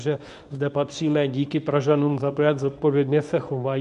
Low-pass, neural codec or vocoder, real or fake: 10.8 kHz; codec, 24 kHz, 0.9 kbps, WavTokenizer, medium speech release version 1; fake